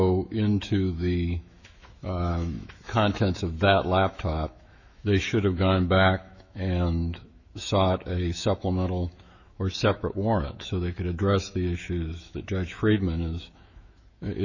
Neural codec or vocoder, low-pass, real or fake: autoencoder, 48 kHz, 128 numbers a frame, DAC-VAE, trained on Japanese speech; 7.2 kHz; fake